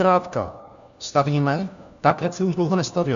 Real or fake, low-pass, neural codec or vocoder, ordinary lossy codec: fake; 7.2 kHz; codec, 16 kHz, 1 kbps, FunCodec, trained on LibriTTS, 50 frames a second; AAC, 96 kbps